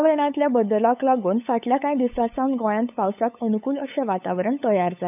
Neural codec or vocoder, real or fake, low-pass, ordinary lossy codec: codec, 16 kHz, 8 kbps, FunCodec, trained on LibriTTS, 25 frames a second; fake; 3.6 kHz; none